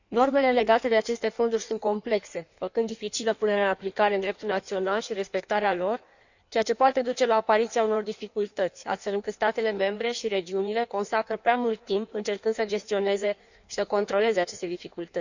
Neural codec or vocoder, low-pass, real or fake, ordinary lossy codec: codec, 16 kHz in and 24 kHz out, 1.1 kbps, FireRedTTS-2 codec; 7.2 kHz; fake; none